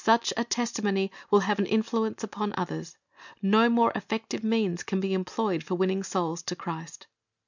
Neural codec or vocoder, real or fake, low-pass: none; real; 7.2 kHz